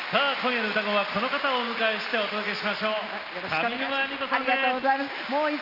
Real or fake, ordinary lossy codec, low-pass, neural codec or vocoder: real; Opus, 32 kbps; 5.4 kHz; none